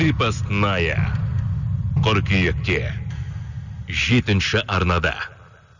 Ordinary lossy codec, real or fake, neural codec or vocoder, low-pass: none; real; none; 7.2 kHz